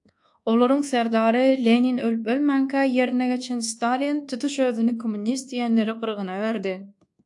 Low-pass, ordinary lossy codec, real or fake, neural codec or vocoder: 10.8 kHz; AAC, 64 kbps; fake; codec, 24 kHz, 1.2 kbps, DualCodec